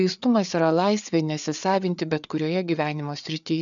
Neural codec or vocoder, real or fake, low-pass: codec, 16 kHz, 4 kbps, FreqCodec, larger model; fake; 7.2 kHz